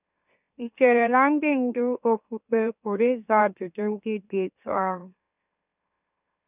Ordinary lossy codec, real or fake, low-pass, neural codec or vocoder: none; fake; 3.6 kHz; autoencoder, 44.1 kHz, a latent of 192 numbers a frame, MeloTTS